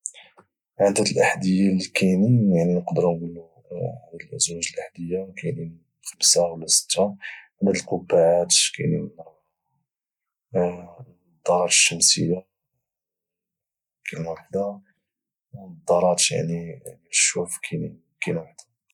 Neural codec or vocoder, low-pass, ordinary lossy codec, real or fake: none; 19.8 kHz; none; real